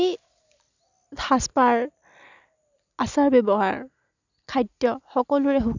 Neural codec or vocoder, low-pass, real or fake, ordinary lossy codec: none; 7.2 kHz; real; none